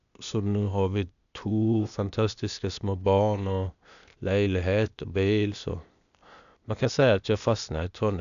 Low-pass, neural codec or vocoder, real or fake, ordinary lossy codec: 7.2 kHz; codec, 16 kHz, 0.8 kbps, ZipCodec; fake; none